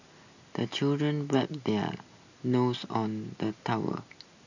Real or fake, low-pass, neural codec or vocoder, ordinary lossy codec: real; 7.2 kHz; none; none